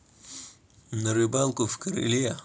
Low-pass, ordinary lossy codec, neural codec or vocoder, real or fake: none; none; none; real